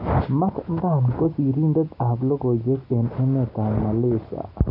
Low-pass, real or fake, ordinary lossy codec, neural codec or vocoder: 5.4 kHz; real; none; none